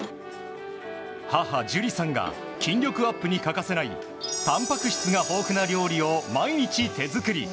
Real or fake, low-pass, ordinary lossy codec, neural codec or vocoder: real; none; none; none